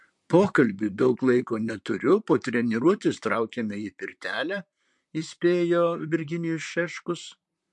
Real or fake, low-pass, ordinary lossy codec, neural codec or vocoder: fake; 10.8 kHz; MP3, 64 kbps; vocoder, 44.1 kHz, 128 mel bands, Pupu-Vocoder